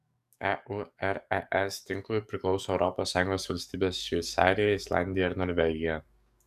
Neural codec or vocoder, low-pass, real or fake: codec, 44.1 kHz, 7.8 kbps, DAC; 14.4 kHz; fake